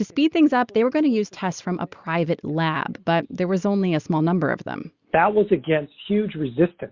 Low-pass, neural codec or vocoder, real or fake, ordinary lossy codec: 7.2 kHz; none; real; Opus, 64 kbps